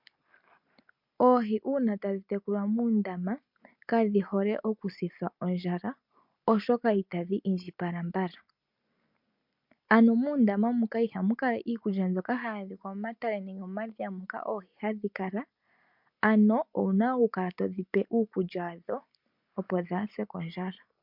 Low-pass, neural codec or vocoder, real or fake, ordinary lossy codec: 5.4 kHz; none; real; MP3, 48 kbps